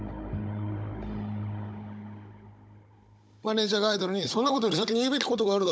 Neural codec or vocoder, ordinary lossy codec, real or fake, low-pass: codec, 16 kHz, 16 kbps, FunCodec, trained on Chinese and English, 50 frames a second; none; fake; none